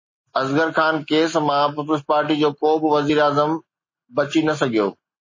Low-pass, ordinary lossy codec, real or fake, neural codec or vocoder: 7.2 kHz; MP3, 32 kbps; real; none